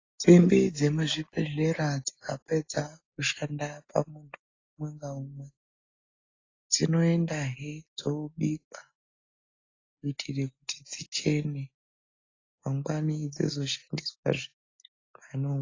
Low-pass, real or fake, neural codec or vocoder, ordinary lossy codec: 7.2 kHz; real; none; AAC, 32 kbps